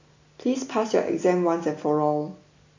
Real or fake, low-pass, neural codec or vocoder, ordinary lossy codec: real; 7.2 kHz; none; AAC, 48 kbps